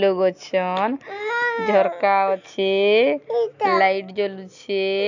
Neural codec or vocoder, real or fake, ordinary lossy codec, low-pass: none; real; none; 7.2 kHz